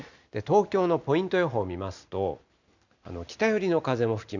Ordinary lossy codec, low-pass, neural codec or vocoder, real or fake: none; 7.2 kHz; none; real